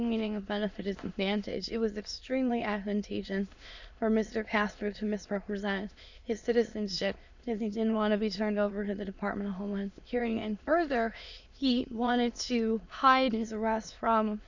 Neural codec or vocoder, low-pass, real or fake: autoencoder, 22.05 kHz, a latent of 192 numbers a frame, VITS, trained on many speakers; 7.2 kHz; fake